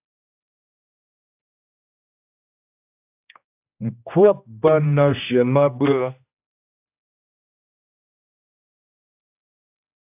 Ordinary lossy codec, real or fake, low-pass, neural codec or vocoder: AAC, 32 kbps; fake; 3.6 kHz; codec, 16 kHz, 1 kbps, X-Codec, HuBERT features, trained on general audio